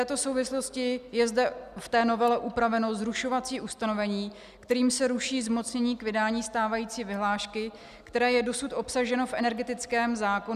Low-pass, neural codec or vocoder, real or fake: 14.4 kHz; none; real